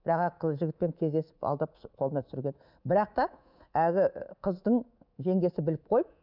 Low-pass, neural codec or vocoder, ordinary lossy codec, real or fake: 5.4 kHz; codec, 24 kHz, 3.1 kbps, DualCodec; none; fake